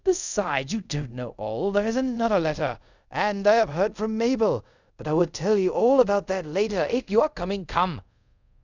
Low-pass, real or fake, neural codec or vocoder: 7.2 kHz; fake; codec, 24 kHz, 0.5 kbps, DualCodec